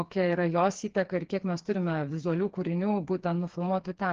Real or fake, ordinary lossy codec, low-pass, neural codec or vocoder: fake; Opus, 16 kbps; 7.2 kHz; codec, 16 kHz, 4 kbps, FreqCodec, smaller model